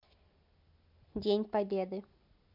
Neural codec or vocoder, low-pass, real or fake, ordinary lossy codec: none; 5.4 kHz; real; MP3, 48 kbps